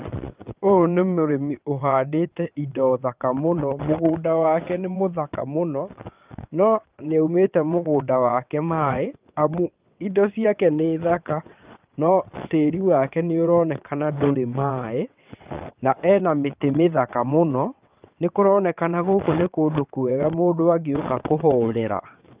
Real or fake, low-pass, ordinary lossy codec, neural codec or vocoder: fake; 3.6 kHz; Opus, 24 kbps; vocoder, 22.05 kHz, 80 mel bands, WaveNeXt